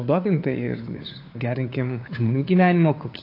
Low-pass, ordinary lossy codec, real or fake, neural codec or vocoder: 5.4 kHz; AAC, 32 kbps; fake; codec, 16 kHz, 4 kbps, FunCodec, trained on LibriTTS, 50 frames a second